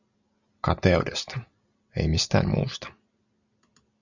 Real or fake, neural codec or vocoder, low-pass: real; none; 7.2 kHz